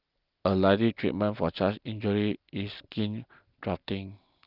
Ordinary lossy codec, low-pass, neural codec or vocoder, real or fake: Opus, 32 kbps; 5.4 kHz; none; real